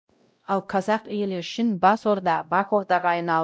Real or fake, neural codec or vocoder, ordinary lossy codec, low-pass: fake; codec, 16 kHz, 0.5 kbps, X-Codec, WavLM features, trained on Multilingual LibriSpeech; none; none